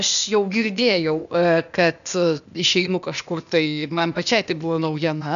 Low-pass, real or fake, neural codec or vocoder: 7.2 kHz; fake; codec, 16 kHz, 0.8 kbps, ZipCodec